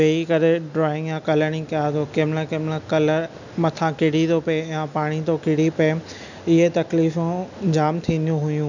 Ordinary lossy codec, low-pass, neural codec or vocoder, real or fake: none; 7.2 kHz; none; real